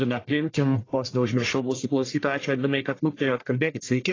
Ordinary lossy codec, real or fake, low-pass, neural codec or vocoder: AAC, 32 kbps; fake; 7.2 kHz; codec, 44.1 kHz, 1.7 kbps, Pupu-Codec